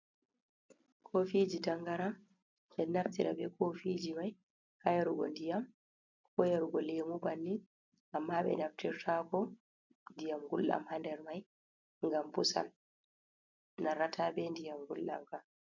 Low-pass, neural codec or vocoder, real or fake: 7.2 kHz; none; real